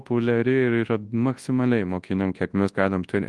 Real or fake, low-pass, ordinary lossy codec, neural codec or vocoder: fake; 10.8 kHz; Opus, 24 kbps; codec, 24 kHz, 0.9 kbps, WavTokenizer, large speech release